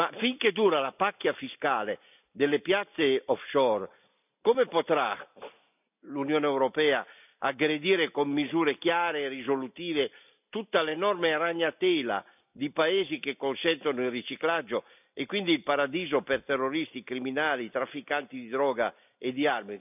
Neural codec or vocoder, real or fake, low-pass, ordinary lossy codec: none; real; 3.6 kHz; none